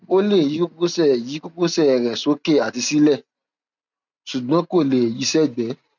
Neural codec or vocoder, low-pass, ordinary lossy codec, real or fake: none; 7.2 kHz; none; real